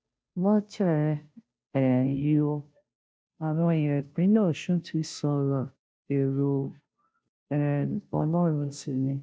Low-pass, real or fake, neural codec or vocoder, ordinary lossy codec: none; fake; codec, 16 kHz, 0.5 kbps, FunCodec, trained on Chinese and English, 25 frames a second; none